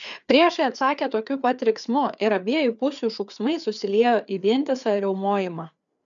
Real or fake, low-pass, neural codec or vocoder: fake; 7.2 kHz; codec, 16 kHz, 4 kbps, FreqCodec, larger model